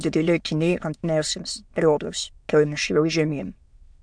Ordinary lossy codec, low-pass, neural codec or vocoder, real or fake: MP3, 64 kbps; 9.9 kHz; autoencoder, 22.05 kHz, a latent of 192 numbers a frame, VITS, trained on many speakers; fake